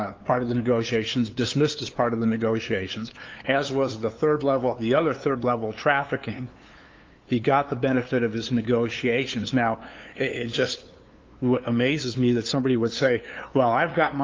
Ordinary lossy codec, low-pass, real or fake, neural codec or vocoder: Opus, 32 kbps; 7.2 kHz; fake; codec, 16 kHz, 2 kbps, FunCodec, trained on LibriTTS, 25 frames a second